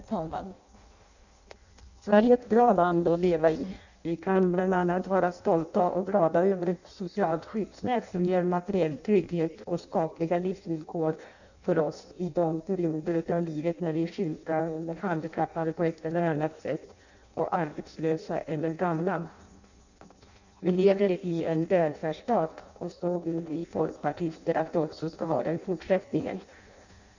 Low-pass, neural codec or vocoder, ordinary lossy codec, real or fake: 7.2 kHz; codec, 16 kHz in and 24 kHz out, 0.6 kbps, FireRedTTS-2 codec; none; fake